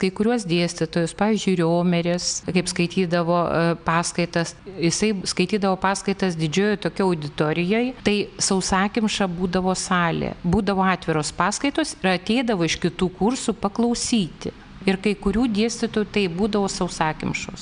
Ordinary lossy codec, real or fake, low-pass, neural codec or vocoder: MP3, 96 kbps; real; 9.9 kHz; none